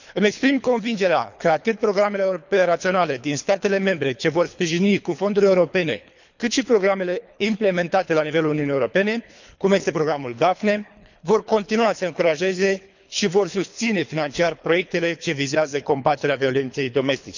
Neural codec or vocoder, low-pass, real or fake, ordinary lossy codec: codec, 24 kHz, 3 kbps, HILCodec; 7.2 kHz; fake; none